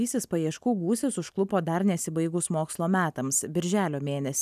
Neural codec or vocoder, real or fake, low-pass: none; real; 14.4 kHz